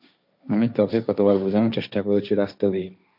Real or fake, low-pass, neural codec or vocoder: fake; 5.4 kHz; codec, 16 kHz, 1.1 kbps, Voila-Tokenizer